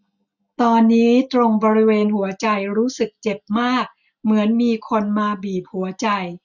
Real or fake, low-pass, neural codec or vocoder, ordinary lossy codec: real; 7.2 kHz; none; none